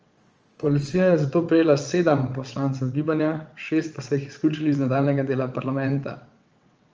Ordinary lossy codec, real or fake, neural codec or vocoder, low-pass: Opus, 24 kbps; fake; vocoder, 22.05 kHz, 80 mel bands, WaveNeXt; 7.2 kHz